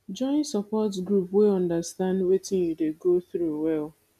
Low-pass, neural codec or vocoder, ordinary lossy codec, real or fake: 14.4 kHz; none; none; real